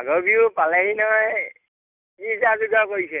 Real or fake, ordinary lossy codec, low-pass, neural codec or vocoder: real; none; 3.6 kHz; none